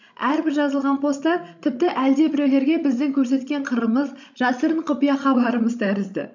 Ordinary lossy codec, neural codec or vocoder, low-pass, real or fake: none; codec, 16 kHz, 16 kbps, FreqCodec, larger model; 7.2 kHz; fake